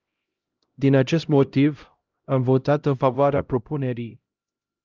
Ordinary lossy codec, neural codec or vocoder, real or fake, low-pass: Opus, 24 kbps; codec, 16 kHz, 0.5 kbps, X-Codec, HuBERT features, trained on LibriSpeech; fake; 7.2 kHz